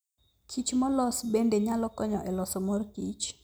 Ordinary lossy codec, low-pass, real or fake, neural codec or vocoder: none; none; real; none